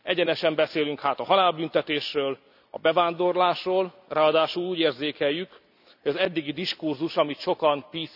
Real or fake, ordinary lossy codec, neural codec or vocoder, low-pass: real; none; none; 5.4 kHz